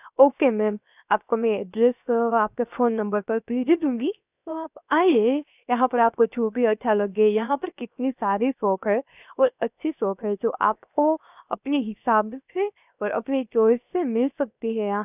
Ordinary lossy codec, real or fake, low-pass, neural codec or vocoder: none; fake; 3.6 kHz; codec, 16 kHz, 0.7 kbps, FocalCodec